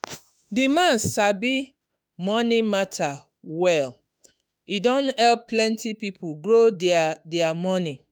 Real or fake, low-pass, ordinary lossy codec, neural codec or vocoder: fake; none; none; autoencoder, 48 kHz, 32 numbers a frame, DAC-VAE, trained on Japanese speech